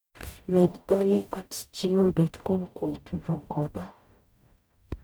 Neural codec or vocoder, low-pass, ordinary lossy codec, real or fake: codec, 44.1 kHz, 0.9 kbps, DAC; none; none; fake